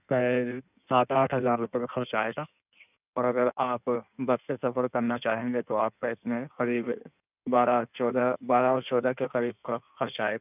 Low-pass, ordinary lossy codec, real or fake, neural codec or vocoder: 3.6 kHz; none; fake; codec, 16 kHz in and 24 kHz out, 1.1 kbps, FireRedTTS-2 codec